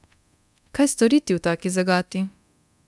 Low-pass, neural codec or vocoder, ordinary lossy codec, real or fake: none; codec, 24 kHz, 0.9 kbps, DualCodec; none; fake